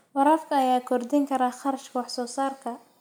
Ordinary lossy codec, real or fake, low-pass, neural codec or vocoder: none; real; none; none